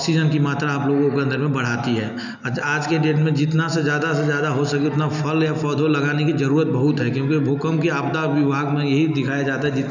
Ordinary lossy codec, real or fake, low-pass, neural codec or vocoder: none; real; 7.2 kHz; none